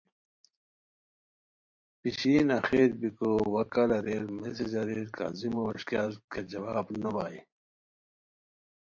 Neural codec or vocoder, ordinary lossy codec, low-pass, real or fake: vocoder, 44.1 kHz, 128 mel bands every 512 samples, BigVGAN v2; MP3, 48 kbps; 7.2 kHz; fake